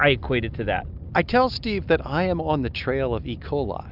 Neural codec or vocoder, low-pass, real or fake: none; 5.4 kHz; real